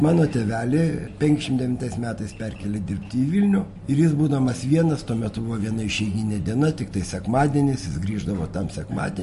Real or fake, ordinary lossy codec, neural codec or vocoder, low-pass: real; MP3, 48 kbps; none; 14.4 kHz